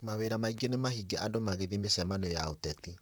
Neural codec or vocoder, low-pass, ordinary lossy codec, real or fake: vocoder, 44.1 kHz, 128 mel bands, Pupu-Vocoder; none; none; fake